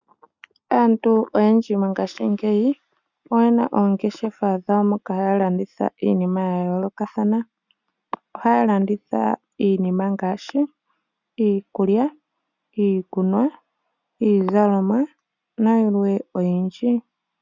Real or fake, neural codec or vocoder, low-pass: real; none; 7.2 kHz